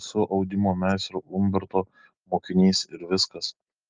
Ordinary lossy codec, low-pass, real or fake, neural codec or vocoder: Opus, 24 kbps; 7.2 kHz; real; none